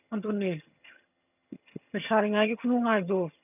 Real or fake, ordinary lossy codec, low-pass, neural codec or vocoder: fake; none; 3.6 kHz; vocoder, 22.05 kHz, 80 mel bands, HiFi-GAN